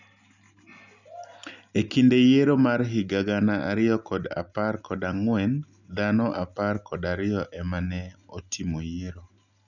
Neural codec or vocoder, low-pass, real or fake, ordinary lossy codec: none; 7.2 kHz; real; none